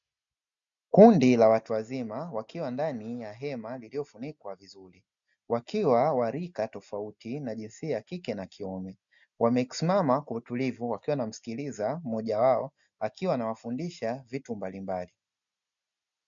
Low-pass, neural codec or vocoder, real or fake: 7.2 kHz; none; real